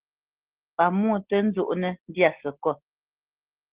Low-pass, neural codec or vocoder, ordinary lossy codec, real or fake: 3.6 kHz; none; Opus, 16 kbps; real